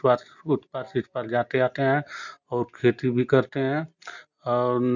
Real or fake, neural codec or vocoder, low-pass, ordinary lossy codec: real; none; 7.2 kHz; none